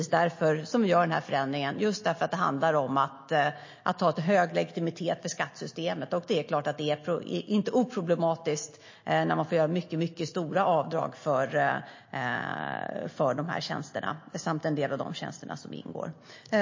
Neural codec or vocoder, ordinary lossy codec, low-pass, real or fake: none; MP3, 32 kbps; 7.2 kHz; real